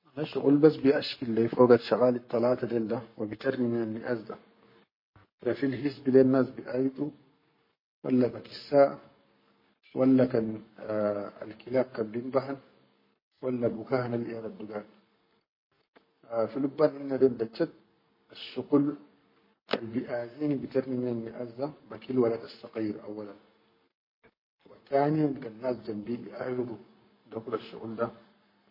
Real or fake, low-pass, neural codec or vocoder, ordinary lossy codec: fake; 5.4 kHz; codec, 44.1 kHz, 7.8 kbps, Pupu-Codec; MP3, 24 kbps